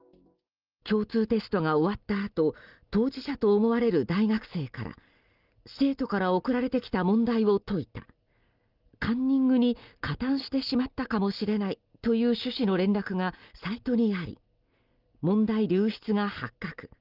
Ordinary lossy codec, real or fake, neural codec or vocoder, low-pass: Opus, 32 kbps; real; none; 5.4 kHz